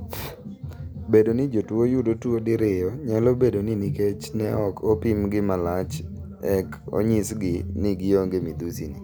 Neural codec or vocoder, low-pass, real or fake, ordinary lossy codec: none; none; real; none